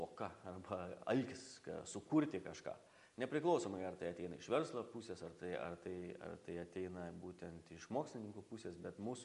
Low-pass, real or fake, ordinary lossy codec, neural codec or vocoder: 10.8 kHz; real; AAC, 96 kbps; none